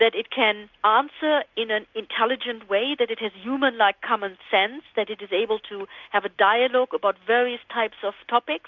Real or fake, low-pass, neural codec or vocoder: real; 7.2 kHz; none